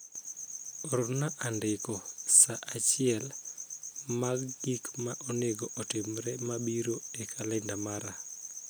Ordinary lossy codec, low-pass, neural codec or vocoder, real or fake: none; none; none; real